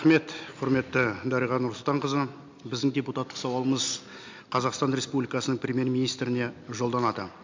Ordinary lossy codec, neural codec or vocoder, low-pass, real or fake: MP3, 64 kbps; none; 7.2 kHz; real